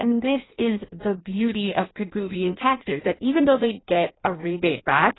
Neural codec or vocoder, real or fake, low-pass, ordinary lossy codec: codec, 16 kHz in and 24 kHz out, 0.6 kbps, FireRedTTS-2 codec; fake; 7.2 kHz; AAC, 16 kbps